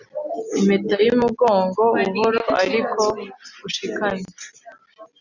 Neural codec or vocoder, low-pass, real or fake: none; 7.2 kHz; real